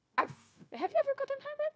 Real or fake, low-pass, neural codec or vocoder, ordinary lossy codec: fake; none; codec, 16 kHz, 0.9 kbps, LongCat-Audio-Codec; none